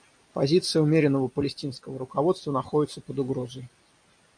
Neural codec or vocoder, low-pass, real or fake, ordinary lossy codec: none; 9.9 kHz; real; AAC, 64 kbps